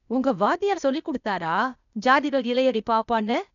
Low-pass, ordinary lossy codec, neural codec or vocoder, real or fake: 7.2 kHz; none; codec, 16 kHz, 0.8 kbps, ZipCodec; fake